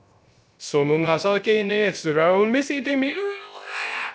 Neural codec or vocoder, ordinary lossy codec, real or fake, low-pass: codec, 16 kHz, 0.3 kbps, FocalCodec; none; fake; none